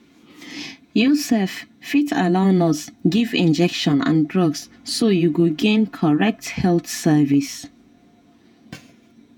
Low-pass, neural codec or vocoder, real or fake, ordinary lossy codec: 19.8 kHz; vocoder, 48 kHz, 128 mel bands, Vocos; fake; none